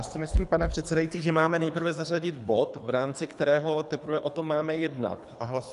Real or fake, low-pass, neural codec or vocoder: fake; 10.8 kHz; codec, 24 kHz, 3 kbps, HILCodec